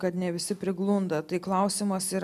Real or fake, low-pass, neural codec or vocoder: real; 14.4 kHz; none